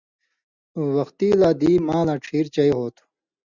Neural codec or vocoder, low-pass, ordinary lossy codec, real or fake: none; 7.2 kHz; Opus, 64 kbps; real